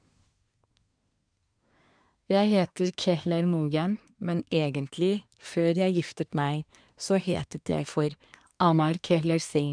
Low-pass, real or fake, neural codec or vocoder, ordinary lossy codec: 9.9 kHz; fake; codec, 24 kHz, 1 kbps, SNAC; none